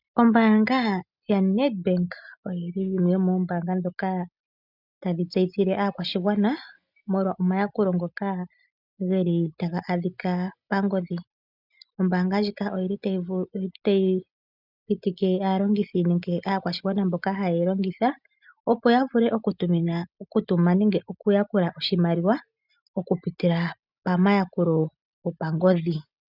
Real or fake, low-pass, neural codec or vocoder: real; 5.4 kHz; none